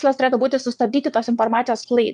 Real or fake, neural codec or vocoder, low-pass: fake; vocoder, 22.05 kHz, 80 mel bands, WaveNeXt; 9.9 kHz